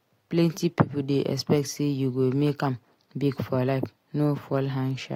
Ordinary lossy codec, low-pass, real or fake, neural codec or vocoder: AAC, 48 kbps; 19.8 kHz; real; none